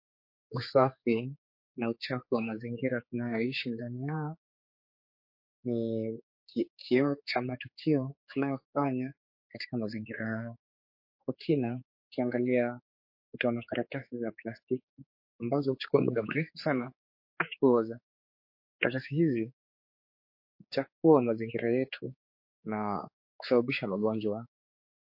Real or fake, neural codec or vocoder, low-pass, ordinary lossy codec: fake; codec, 16 kHz, 4 kbps, X-Codec, HuBERT features, trained on general audio; 5.4 kHz; MP3, 32 kbps